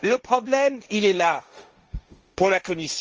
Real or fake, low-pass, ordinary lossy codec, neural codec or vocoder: fake; 7.2 kHz; Opus, 24 kbps; codec, 16 kHz, 1.1 kbps, Voila-Tokenizer